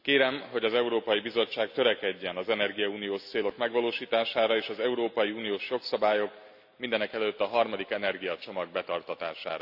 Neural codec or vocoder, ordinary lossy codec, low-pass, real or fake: none; AAC, 48 kbps; 5.4 kHz; real